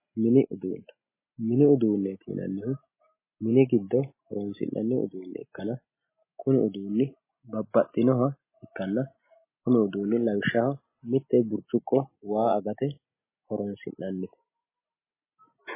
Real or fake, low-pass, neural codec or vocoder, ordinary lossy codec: real; 3.6 kHz; none; MP3, 16 kbps